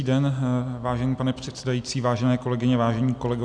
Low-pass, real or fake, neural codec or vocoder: 9.9 kHz; real; none